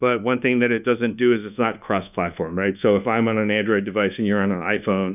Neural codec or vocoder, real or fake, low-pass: codec, 24 kHz, 1.2 kbps, DualCodec; fake; 3.6 kHz